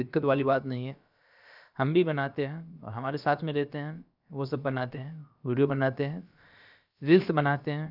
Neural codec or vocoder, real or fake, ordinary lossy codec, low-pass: codec, 16 kHz, about 1 kbps, DyCAST, with the encoder's durations; fake; none; 5.4 kHz